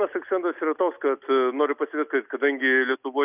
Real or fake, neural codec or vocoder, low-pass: real; none; 3.6 kHz